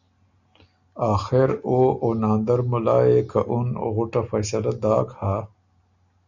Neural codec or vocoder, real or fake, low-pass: none; real; 7.2 kHz